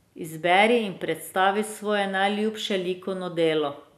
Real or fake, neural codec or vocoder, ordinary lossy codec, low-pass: real; none; none; 14.4 kHz